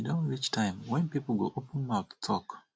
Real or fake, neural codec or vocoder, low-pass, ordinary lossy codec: real; none; none; none